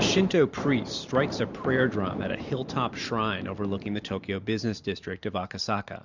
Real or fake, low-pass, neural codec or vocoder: fake; 7.2 kHz; vocoder, 44.1 kHz, 128 mel bands every 512 samples, BigVGAN v2